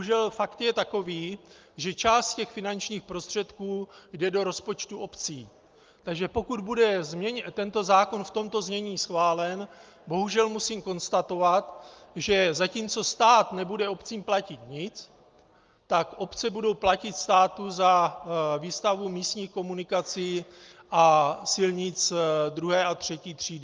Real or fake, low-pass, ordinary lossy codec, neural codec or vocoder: real; 7.2 kHz; Opus, 32 kbps; none